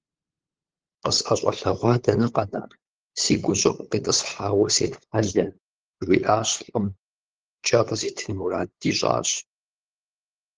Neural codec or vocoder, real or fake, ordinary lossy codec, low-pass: codec, 16 kHz, 8 kbps, FunCodec, trained on LibriTTS, 25 frames a second; fake; Opus, 16 kbps; 7.2 kHz